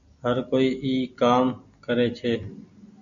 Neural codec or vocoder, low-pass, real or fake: none; 7.2 kHz; real